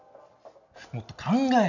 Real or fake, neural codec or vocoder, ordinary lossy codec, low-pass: real; none; none; 7.2 kHz